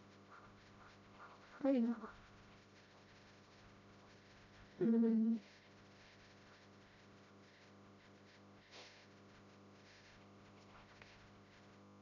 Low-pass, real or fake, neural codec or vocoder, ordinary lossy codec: 7.2 kHz; fake; codec, 16 kHz, 0.5 kbps, FreqCodec, smaller model; none